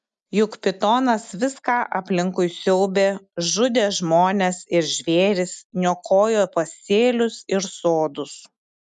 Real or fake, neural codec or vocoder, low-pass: real; none; 10.8 kHz